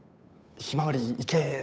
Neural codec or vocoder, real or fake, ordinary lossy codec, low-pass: codec, 16 kHz, 8 kbps, FunCodec, trained on Chinese and English, 25 frames a second; fake; none; none